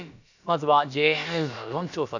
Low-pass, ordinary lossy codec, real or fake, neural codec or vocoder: 7.2 kHz; none; fake; codec, 16 kHz, about 1 kbps, DyCAST, with the encoder's durations